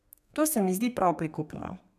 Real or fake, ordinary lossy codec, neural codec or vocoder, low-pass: fake; none; codec, 32 kHz, 1.9 kbps, SNAC; 14.4 kHz